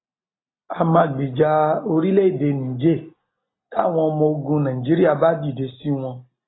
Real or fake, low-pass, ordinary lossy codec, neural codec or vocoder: real; 7.2 kHz; AAC, 16 kbps; none